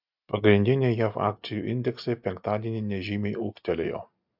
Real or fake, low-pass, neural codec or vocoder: real; 5.4 kHz; none